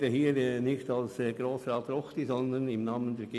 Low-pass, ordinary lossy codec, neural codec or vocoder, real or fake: none; none; none; real